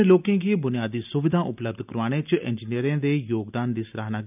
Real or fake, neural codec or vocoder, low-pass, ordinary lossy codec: real; none; 3.6 kHz; none